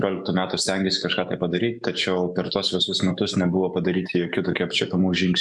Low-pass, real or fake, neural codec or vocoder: 10.8 kHz; fake; autoencoder, 48 kHz, 128 numbers a frame, DAC-VAE, trained on Japanese speech